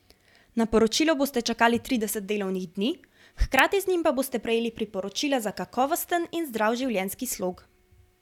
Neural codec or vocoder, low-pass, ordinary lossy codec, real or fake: none; 19.8 kHz; none; real